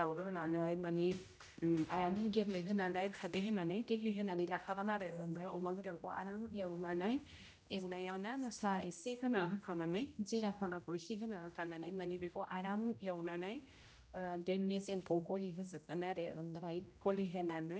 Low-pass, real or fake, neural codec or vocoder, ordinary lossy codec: none; fake; codec, 16 kHz, 0.5 kbps, X-Codec, HuBERT features, trained on general audio; none